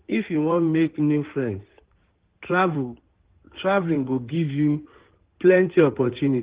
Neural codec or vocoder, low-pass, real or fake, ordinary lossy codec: codec, 16 kHz in and 24 kHz out, 2.2 kbps, FireRedTTS-2 codec; 3.6 kHz; fake; Opus, 16 kbps